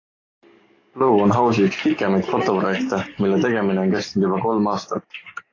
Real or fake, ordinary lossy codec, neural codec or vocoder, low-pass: fake; AAC, 32 kbps; codec, 44.1 kHz, 7.8 kbps, DAC; 7.2 kHz